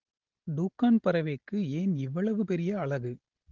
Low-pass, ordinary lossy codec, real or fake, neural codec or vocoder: 7.2 kHz; Opus, 16 kbps; real; none